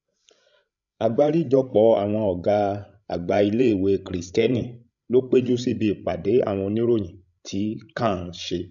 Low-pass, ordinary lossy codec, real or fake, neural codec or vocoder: 7.2 kHz; none; fake; codec, 16 kHz, 16 kbps, FreqCodec, larger model